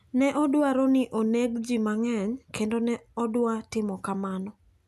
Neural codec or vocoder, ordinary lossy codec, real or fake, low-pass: none; none; real; 14.4 kHz